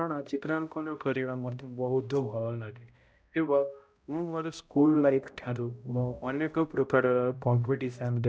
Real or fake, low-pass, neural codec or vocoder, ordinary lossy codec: fake; none; codec, 16 kHz, 0.5 kbps, X-Codec, HuBERT features, trained on balanced general audio; none